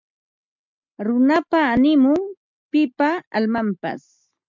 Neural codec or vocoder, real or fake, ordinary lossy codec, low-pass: none; real; MP3, 64 kbps; 7.2 kHz